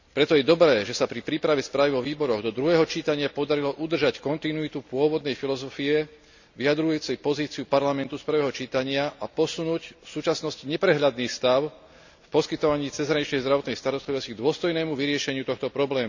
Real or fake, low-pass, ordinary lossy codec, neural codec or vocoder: real; 7.2 kHz; none; none